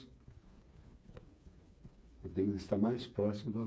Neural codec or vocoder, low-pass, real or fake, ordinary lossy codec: codec, 16 kHz, 4 kbps, FreqCodec, smaller model; none; fake; none